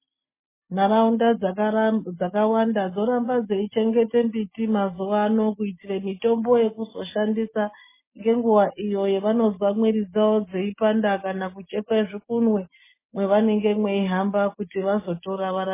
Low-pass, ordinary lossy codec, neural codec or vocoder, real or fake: 3.6 kHz; MP3, 16 kbps; none; real